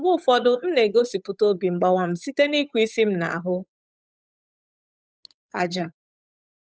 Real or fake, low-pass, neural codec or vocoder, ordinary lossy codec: fake; none; codec, 16 kHz, 8 kbps, FunCodec, trained on Chinese and English, 25 frames a second; none